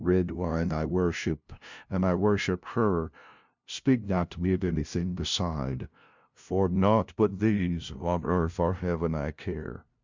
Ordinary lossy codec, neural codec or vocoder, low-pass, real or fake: MP3, 64 kbps; codec, 16 kHz, 0.5 kbps, FunCodec, trained on LibriTTS, 25 frames a second; 7.2 kHz; fake